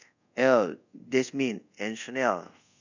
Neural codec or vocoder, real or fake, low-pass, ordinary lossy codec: codec, 24 kHz, 0.5 kbps, DualCodec; fake; 7.2 kHz; none